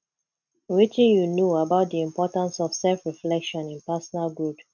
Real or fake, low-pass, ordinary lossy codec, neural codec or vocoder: real; 7.2 kHz; none; none